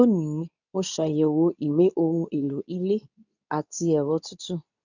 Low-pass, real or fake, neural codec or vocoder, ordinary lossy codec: 7.2 kHz; fake; codec, 24 kHz, 0.9 kbps, WavTokenizer, medium speech release version 2; none